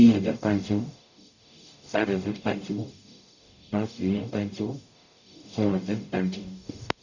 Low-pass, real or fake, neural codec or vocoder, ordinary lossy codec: 7.2 kHz; fake; codec, 44.1 kHz, 0.9 kbps, DAC; none